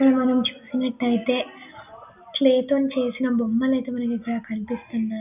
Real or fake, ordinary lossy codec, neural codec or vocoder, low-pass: real; none; none; 3.6 kHz